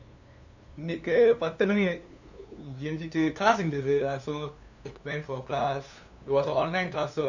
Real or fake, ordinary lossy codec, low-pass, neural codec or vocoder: fake; none; 7.2 kHz; codec, 16 kHz, 2 kbps, FunCodec, trained on LibriTTS, 25 frames a second